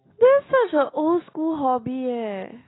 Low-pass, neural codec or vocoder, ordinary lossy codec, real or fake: 7.2 kHz; none; AAC, 16 kbps; real